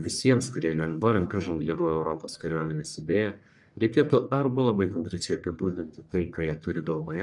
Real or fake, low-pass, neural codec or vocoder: fake; 10.8 kHz; codec, 44.1 kHz, 1.7 kbps, Pupu-Codec